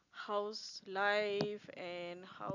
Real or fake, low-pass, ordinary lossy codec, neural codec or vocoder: real; 7.2 kHz; none; none